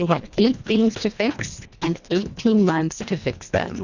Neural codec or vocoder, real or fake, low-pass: codec, 24 kHz, 1.5 kbps, HILCodec; fake; 7.2 kHz